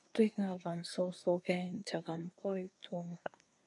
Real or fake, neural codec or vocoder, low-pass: fake; codec, 24 kHz, 1 kbps, SNAC; 10.8 kHz